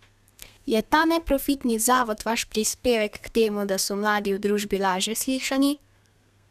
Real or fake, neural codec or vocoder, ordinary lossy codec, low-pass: fake; codec, 32 kHz, 1.9 kbps, SNAC; none; 14.4 kHz